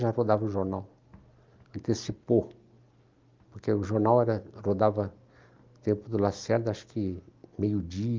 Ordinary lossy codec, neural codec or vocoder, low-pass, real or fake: Opus, 24 kbps; none; 7.2 kHz; real